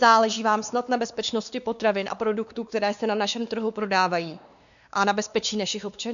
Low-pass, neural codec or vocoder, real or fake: 7.2 kHz; codec, 16 kHz, 2 kbps, X-Codec, WavLM features, trained on Multilingual LibriSpeech; fake